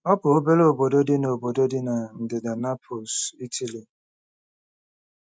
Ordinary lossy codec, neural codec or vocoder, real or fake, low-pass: none; none; real; none